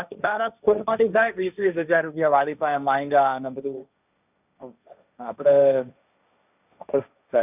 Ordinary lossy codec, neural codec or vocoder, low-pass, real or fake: none; codec, 16 kHz, 1.1 kbps, Voila-Tokenizer; 3.6 kHz; fake